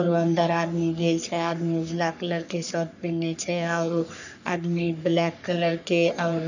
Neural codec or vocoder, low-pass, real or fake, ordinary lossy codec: codec, 44.1 kHz, 3.4 kbps, Pupu-Codec; 7.2 kHz; fake; none